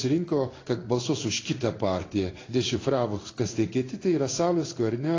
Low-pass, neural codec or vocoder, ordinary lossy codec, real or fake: 7.2 kHz; codec, 16 kHz in and 24 kHz out, 1 kbps, XY-Tokenizer; AAC, 32 kbps; fake